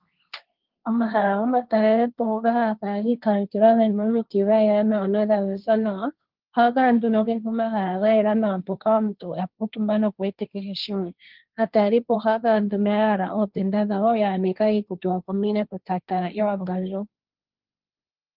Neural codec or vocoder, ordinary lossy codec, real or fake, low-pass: codec, 16 kHz, 1.1 kbps, Voila-Tokenizer; Opus, 32 kbps; fake; 5.4 kHz